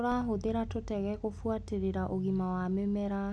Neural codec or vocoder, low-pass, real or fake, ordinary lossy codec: none; none; real; none